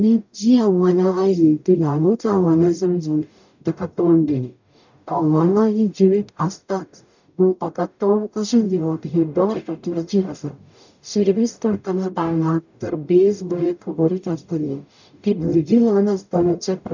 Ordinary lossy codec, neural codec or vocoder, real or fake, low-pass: none; codec, 44.1 kHz, 0.9 kbps, DAC; fake; 7.2 kHz